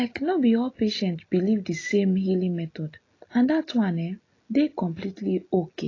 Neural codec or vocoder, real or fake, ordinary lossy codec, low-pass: none; real; AAC, 32 kbps; 7.2 kHz